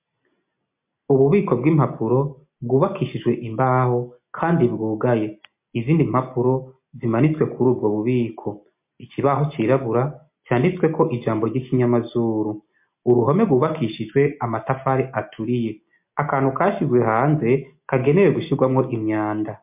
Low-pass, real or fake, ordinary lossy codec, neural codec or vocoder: 3.6 kHz; real; MP3, 32 kbps; none